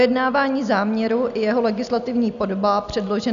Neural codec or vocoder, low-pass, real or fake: none; 7.2 kHz; real